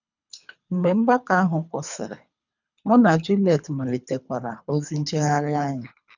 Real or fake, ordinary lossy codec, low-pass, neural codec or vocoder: fake; none; 7.2 kHz; codec, 24 kHz, 3 kbps, HILCodec